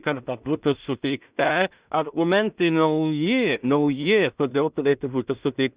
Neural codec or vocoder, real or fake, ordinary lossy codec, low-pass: codec, 16 kHz in and 24 kHz out, 0.4 kbps, LongCat-Audio-Codec, two codebook decoder; fake; Opus, 64 kbps; 3.6 kHz